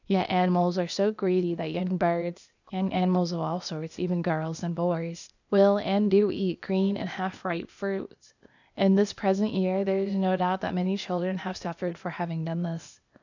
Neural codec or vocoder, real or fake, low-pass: codec, 16 kHz, 0.8 kbps, ZipCodec; fake; 7.2 kHz